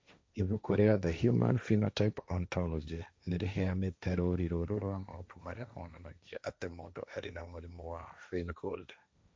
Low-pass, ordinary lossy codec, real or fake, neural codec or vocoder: none; none; fake; codec, 16 kHz, 1.1 kbps, Voila-Tokenizer